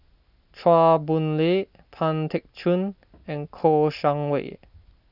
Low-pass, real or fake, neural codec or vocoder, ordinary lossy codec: 5.4 kHz; real; none; none